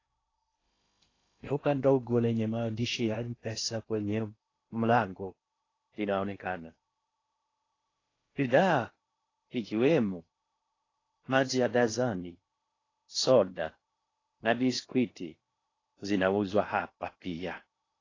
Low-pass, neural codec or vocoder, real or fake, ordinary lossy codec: 7.2 kHz; codec, 16 kHz in and 24 kHz out, 0.8 kbps, FocalCodec, streaming, 65536 codes; fake; AAC, 32 kbps